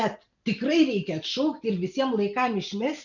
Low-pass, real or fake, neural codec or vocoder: 7.2 kHz; real; none